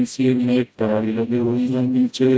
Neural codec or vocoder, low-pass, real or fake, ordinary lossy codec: codec, 16 kHz, 0.5 kbps, FreqCodec, smaller model; none; fake; none